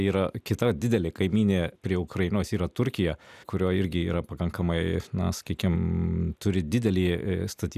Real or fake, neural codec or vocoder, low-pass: real; none; 14.4 kHz